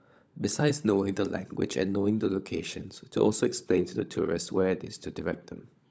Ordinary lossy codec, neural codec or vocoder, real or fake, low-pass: none; codec, 16 kHz, 16 kbps, FunCodec, trained on LibriTTS, 50 frames a second; fake; none